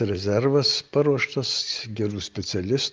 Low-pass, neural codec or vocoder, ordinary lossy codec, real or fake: 7.2 kHz; none; Opus, 32 kbps; real